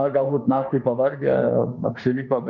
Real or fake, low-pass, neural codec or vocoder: fake; 7.2 kHz; autoencoder, 48 kHz, 32 numbers a frame, DAC-VAE, trained on Japanese speech